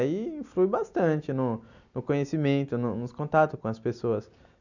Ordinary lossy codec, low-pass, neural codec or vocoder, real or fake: none; 7.2 kHz; none; real